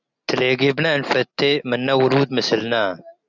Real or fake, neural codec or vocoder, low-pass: real; none; 7.2 kHz